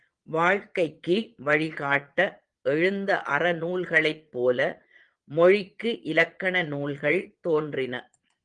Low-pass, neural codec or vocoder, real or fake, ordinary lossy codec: 9.9 kHz; vocoder, 22.05 kHz, 80 mel bands, Vocos; fake; Opus, 24 kbps